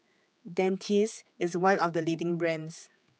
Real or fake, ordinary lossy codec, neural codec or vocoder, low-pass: fake; none; codec, 16 kHz, 4 kbps, X-Codec, HuBERT features, trained on general audio; none